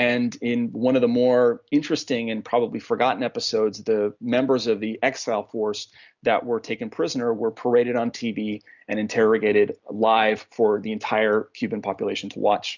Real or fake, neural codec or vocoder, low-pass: real; none; 7.2 kHz